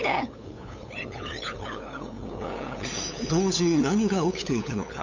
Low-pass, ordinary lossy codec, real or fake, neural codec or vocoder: 7.2 kHz; none; fake; codec, 16 kHz, 8 kbps, FunCodec, trained on LibriTTS, 25 frames a second